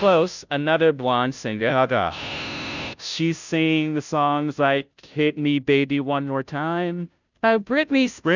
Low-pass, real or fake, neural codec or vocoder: 7.2 kHz; fake; codec, 16 kHz, 0.5 kbps, FunCodec, trained on Chinese and English, 25 frames a second